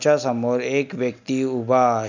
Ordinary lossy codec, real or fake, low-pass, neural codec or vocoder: none; real; 7.2 kHz; none